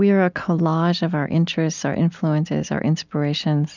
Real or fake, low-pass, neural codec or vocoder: real; 7.2 kHz; none